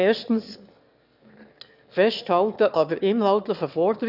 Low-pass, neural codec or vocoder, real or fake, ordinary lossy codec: 5.4 kHz; autoencoder, 22.05 kHz, a latent of 192 numbers a frame, VITS, trained on one speaker; fake; none